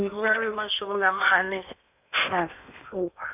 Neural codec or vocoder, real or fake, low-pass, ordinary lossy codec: codec, 16 kHz in and 24 kHz out, 0.8 kbps, FocalCodec, streaming, 65536 codes; fake; 3.6 kHz; none